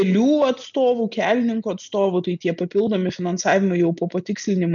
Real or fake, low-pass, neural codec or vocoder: real; 7.2 kHz; none